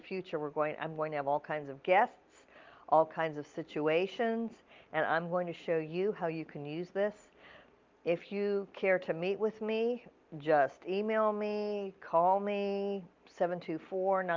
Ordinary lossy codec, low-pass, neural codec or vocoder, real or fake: Opus, 32 kbps; 7.2 kHz; none; real